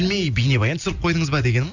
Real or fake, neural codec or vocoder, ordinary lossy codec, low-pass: real; none; none; 7.2 kHz